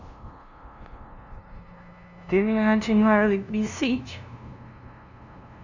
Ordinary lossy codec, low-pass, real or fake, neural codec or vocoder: none; 7.2 kHz; fake; codec, 16 kHz, 0.5 kbps, FunCodec, trained on LibriTTS, 25 frames a second